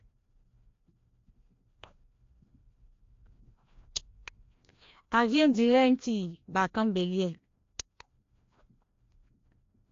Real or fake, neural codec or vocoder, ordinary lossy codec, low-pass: fake; codec, 16 kHz, 1 kbps, FreqCodec, larger model; AAC, 48 kbps; 7.2 kHz